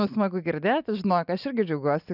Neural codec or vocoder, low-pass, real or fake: none; 5.4 kHz; real